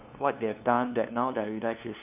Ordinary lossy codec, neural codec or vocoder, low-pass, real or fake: none; codec, 16 kHz, 2 kbps, FunCodec, trained on LibriTTS, 25 frames a second; 3.6 kHz; fake